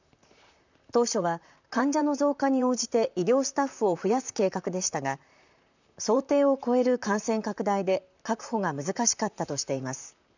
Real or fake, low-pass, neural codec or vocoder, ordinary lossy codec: fake; 7.2 kHz; vocoder, 44.1 kHz, 128 mel bands, Pupu-Vocoder; none